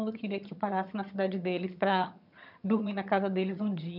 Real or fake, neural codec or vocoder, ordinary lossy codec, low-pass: fake; vocoder, 22.05 kHz, 80 mel bands, HiFi-GAN; none; 5.4 kHz